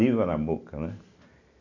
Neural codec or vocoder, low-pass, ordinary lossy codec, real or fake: none; 7.2 kHz; none; real